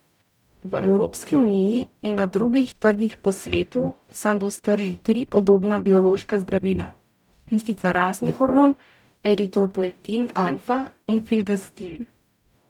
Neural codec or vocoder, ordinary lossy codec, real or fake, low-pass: codec, 44.1 kHz, 0.9 kbps, DAC; none; fake; 19.8 kHz